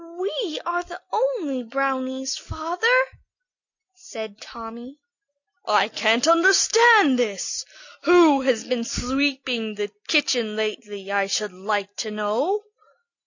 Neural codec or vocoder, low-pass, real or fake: none; 7.2 kHz; real